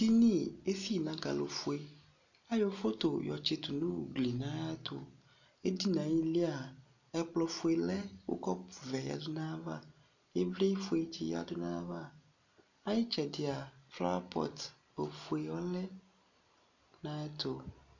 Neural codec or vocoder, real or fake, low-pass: none; real; 7.2 kHz